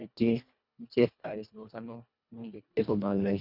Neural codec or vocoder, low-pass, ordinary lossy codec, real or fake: codec, 24 kHz, 1.5 kbps, HILCodec; 5.4 kHz; none; fake